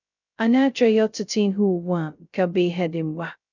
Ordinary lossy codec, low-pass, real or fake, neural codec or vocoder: none; 7.2 kHz; fake; codec, 16 kHz, 0.2 kbps, FocalCodec